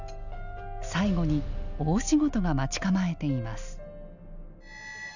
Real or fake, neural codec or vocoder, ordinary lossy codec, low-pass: real; none; none; 7.2 kHz